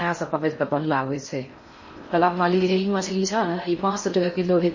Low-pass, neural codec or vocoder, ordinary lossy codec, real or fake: 7.2 kHz; codec, 16 kHz in and 24 kHz out, 0.6 kbps, FocalCodec, streaming, 4096 codes; MP3, 32 kbps; fake